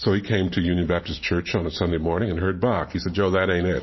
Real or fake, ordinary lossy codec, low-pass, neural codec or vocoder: fake; MP3, 24 kbps; 7.2 kHz; vocoder, 44.1 kHz, 128 mel bands every 512 samples, BigVGAN v2